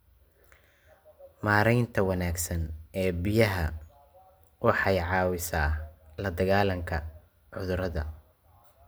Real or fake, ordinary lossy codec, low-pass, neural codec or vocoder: real; none; none; none